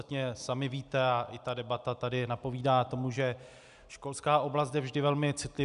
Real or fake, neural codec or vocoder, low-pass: real; none; 10.8 kHz